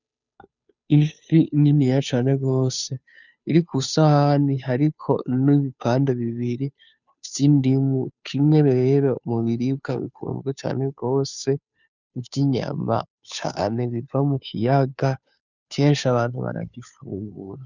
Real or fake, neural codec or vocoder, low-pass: fake; codec, 16 kHz, 2 kbps, FunCodec, trained on Chinese and English, 25 frames a second; 7.2 kHz